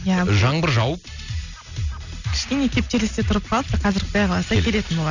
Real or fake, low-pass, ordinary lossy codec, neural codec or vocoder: real; 7.2 kHz; none; none